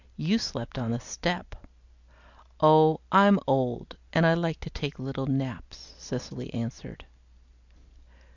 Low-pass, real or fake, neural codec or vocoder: 7.2 kHz; real; none